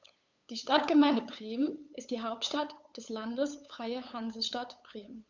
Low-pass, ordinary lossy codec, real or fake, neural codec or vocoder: 7.2 kHz; none; fake; codec, 16 kHz, 8 kbps, FunCodec, trained on LibriTTS, 25 frames a second